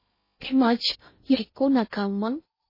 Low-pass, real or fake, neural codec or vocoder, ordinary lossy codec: 5.4 kHz; fake; codec, 16 kHz in and 24 kHz out, 0.6 kbps, FocalCodec, streaming, 2048 codes; MP3, 24 kbps